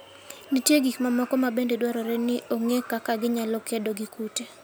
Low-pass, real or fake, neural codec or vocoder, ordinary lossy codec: none; real; none; none